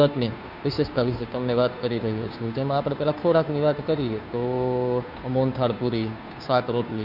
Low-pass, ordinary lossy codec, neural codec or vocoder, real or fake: 5.4 kHz; none; codec, 16 kHz, 2 kbps, FunCodec, trained on Chinese and English, 25 frames a second; fake